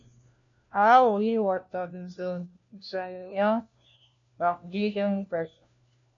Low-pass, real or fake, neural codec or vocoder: 7.2 kHz; fake; codec, 16 kHz, 1 kbps, FunCodec, trained on LibriTTS, 50 frames a second